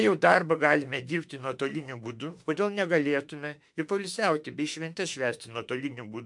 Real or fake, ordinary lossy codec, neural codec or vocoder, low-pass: fake; MP3, 64 kbps; autoencoder, 48 kHz, 32 numbers a frame, DAC-VAE, trained on Japanese speech; 10.8 kHz